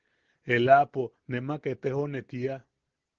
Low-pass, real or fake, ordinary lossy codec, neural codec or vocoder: 7.2 kHz; real; Opus, 16 kbps; none